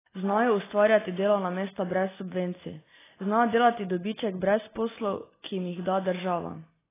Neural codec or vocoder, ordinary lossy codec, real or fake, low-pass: none; AAC, 16 kbps; real; 3.6 kHz